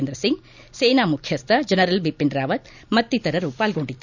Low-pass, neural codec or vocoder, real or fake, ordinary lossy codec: 7.2 kHz; none; real; none